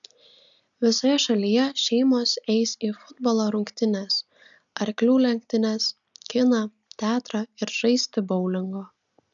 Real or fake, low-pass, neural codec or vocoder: real; 7.2 kHz; none